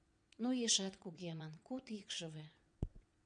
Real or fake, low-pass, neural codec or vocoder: fake; 9.9 kHz; vocoder, 22.05 kHz, 80 mel bands, WaveNeXt